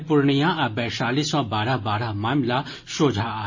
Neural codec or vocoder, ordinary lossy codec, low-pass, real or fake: none; MP3, 32 kbps; 7.2 kHz; real